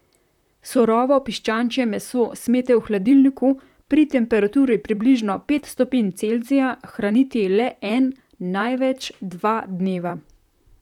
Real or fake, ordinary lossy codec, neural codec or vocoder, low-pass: fake; none; vocoder, 44.1 kHz, 128 mel bands, Pupu-Vocoder; 19.8 kHz